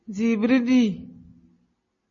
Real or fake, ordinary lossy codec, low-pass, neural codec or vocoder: real; MP3, 32 kbps; 7.2 kHz; none